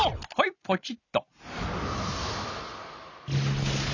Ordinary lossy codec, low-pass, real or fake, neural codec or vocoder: none; 7.2 kHz; real; none